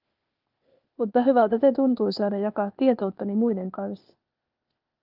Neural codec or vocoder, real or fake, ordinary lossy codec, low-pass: codec, 16 kHz, 0.8 kbps, ZipCodec; fake; Opus, 32 kbps; 5.4 kHz